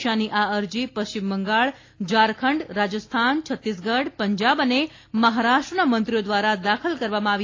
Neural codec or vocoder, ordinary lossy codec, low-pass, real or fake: none; AAC, 32 kbps; 7.2 kHz; real